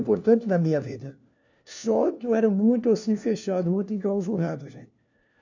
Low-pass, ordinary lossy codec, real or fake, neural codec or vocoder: 7.2 kHz; none; fake; codec, 16 kHz, 1 kbps, FunCodec, trained on LibriTTS, 50 frames a second